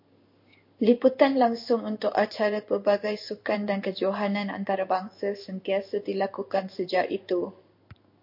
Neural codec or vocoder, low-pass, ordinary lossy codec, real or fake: codec, 16 kHz in and 24 kHz out, 1 kbps, XY-Tokenizer; 5.4 kHz; MP3, 32 kbps; fake